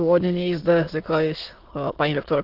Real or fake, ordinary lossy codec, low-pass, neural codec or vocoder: fake; Opus, 16 kbps; 5.4 kHz; autoencoder, 22.05 kHz, a latent of 192 numbers a frame, VITS, trained on many speakers